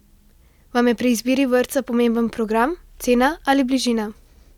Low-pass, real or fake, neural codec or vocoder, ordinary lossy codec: 19.8 kHz; real; none; none